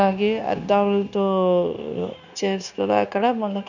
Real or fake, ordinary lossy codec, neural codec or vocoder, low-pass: fake; none; codec, 16 kHz, 0.9 kbps, LongCat-Audio-Codec; 7.2 kHz